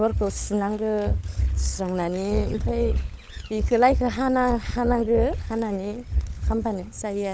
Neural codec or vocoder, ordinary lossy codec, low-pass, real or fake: codec, 16 kHz, 16 kbps, FunCodec, trained on LibriTTS, 50 frames a second; none; none; fake